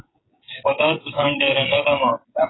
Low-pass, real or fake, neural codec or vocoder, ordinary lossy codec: 7.2 kHz; fake; codec, 16 kHz, 16 kbps, FreqCodec, smaller model; AAC, 16 kbps